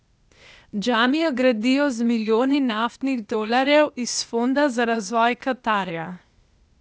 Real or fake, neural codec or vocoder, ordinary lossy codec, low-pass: fake; codec, 16 kHz, 0.8 kbps, ZipCodec; none; none